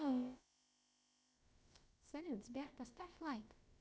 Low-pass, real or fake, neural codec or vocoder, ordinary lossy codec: none; fake; codec, 16 kHz, about 1 kbps, DyCAST, with the encoder's durations; none